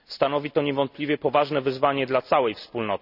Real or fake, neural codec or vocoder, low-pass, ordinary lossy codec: real; none; 5.4 kHz; none